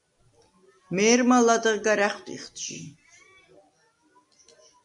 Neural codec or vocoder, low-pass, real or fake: none; 10.8 kHz; real